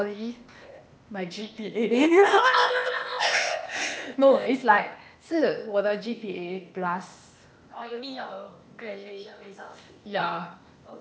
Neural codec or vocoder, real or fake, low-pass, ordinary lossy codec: codec, 16 kHz, 0.8 kbps, ZipCodec; fake; none; none